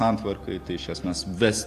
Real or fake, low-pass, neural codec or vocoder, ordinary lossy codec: real; 14.4 kHz; none; AAC, 64 kbps